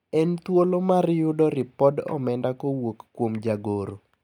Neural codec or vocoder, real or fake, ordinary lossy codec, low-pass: none; real; none; 19.8 kHz